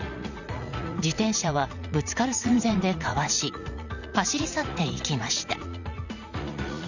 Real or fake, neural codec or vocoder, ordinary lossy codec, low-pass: fake; vocoder, 44.1 kHz, 80 mel bands, Vocos; none; 7.2 kHz